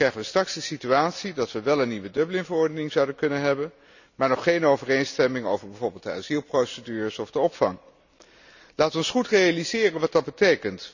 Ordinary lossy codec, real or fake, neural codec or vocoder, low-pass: none; real; none; 7.2 kHz